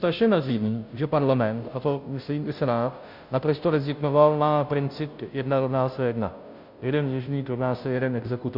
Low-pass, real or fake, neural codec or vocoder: 5.4 kHz; fake; codec, 16 kHz, 0.5 kbps, FunCodec, trained on Chinese and English, 25 frames a second